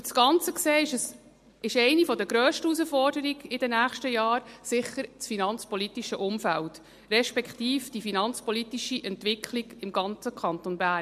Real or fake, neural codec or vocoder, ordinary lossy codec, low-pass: real; none; MP3, 64 kbps; 14.4 kHz